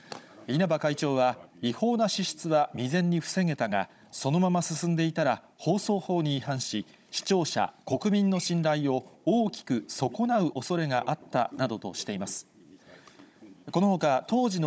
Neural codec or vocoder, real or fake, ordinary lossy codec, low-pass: codec, 16 kHz, 16 kbps, FunCodec, trained on Chinese and English, 50 frames a second; fake; none; none